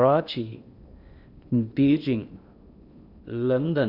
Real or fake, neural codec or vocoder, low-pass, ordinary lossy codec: fake; codec, 16 kHz in and 24 kHz out, 0.8 kbps, FocalCodec, streaming, 65536 codes; 5.4 kHz; none